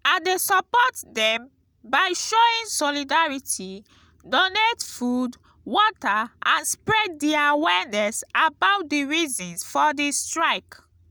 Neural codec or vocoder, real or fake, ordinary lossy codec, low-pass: none; real; none; none